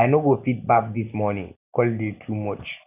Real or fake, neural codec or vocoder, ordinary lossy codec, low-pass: real; none; none; 3.6 kHz